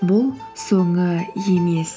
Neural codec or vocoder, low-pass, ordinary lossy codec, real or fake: none; none; none; real